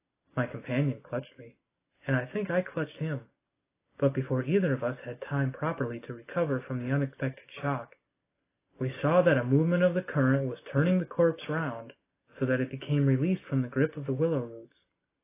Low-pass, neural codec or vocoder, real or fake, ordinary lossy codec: 3.6 kHz; none; real; AAC, 24 kbps